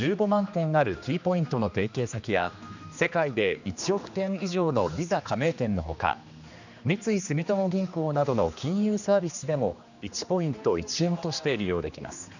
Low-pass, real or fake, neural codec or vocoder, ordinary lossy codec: 7.2 kHz; fake; codec, 16 kHz, 2 kbps, X-Codec, HuBERT features, trained on general audio; none